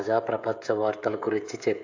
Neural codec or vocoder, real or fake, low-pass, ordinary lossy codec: codec, 16 kHz, 16 kbps, FreqCodec, smaller model; fake; 7.2 kHz; AAC, 48 kbps